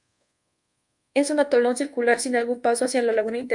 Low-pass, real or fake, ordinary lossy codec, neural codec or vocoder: 10.8 kHz; fake; MP3, 96 kbps; codec, 24 kHz, 1.2 kbps, DualCodec